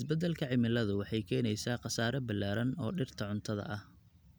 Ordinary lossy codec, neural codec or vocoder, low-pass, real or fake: none; none; none; real